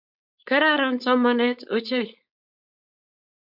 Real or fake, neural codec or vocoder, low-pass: fake; codec, 16 kHz, 4.8 kbps, FACodec; 5.4 kHz